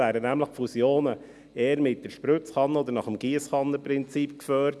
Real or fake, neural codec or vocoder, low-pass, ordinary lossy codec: real; none; none; none